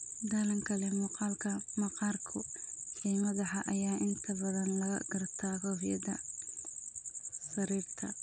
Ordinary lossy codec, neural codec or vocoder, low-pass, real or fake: none; none; none; real